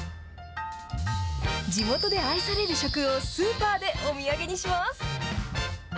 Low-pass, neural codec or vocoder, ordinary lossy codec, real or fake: none; none; none; real